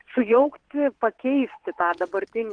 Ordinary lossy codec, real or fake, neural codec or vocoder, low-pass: Opus, 16 kbps; fake; vocoder, 22.05 kHz, 80 mel bands, WaveNeXt; 9.9 kHz